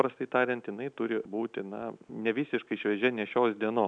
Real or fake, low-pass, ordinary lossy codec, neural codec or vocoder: real; 9.9 kHz; MP3, 96 kbps; none